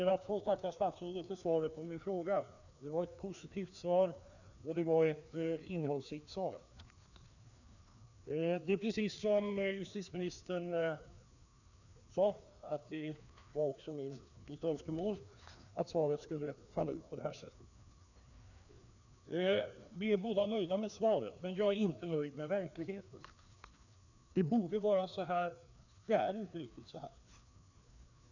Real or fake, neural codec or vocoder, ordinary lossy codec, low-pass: fake; codec, 16 kHz, 2 kbps, FreqCodec, larger model; none; 7.2 kHz